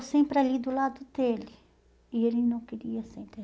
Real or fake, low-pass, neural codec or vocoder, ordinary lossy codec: real; none; none; none